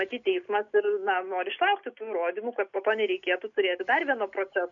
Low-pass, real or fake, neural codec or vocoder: 7.2 kHz; real; none